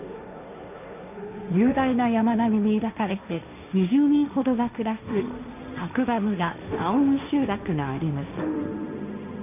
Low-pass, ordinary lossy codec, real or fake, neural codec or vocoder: 3.6 kHz; none; fake; codec, 16 kHz, 1.1 kbps, Voila-Tokenizer